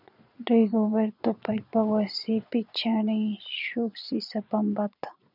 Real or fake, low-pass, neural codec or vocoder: fake; 5.4 kHz; vocoder, 22.05 kHz, 80 mel bands, Vocos